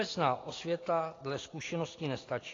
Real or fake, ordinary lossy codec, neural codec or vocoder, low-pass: real; AAC, 32 kbps; none; 7.2 kHz